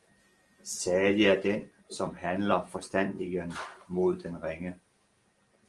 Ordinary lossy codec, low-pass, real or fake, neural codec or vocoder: Opus, 24 kbps; 10.8 kHz; real; none